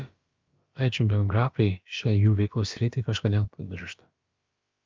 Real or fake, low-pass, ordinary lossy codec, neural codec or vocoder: fake; 7.2 kHz; Opus, 24 kbps; codec, 16 kHz, about 1 kbps, DyCAST, with the encoder's durations